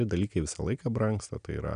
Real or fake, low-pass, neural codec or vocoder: real; 9.9 kHz; none